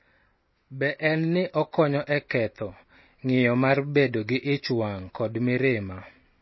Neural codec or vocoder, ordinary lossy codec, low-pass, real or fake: none; MP3, 24 kbps; 7.2 kHz; real